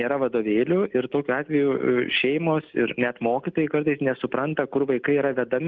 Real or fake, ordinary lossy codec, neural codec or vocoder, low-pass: real; Opus, 32 kbps; none; 7.2 kHz